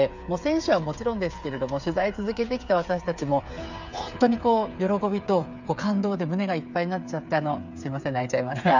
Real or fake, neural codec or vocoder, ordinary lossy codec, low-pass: fake; codec, 16 kHz, 8 kbps, FreqCodec, smaller model; none; 7.2 kHz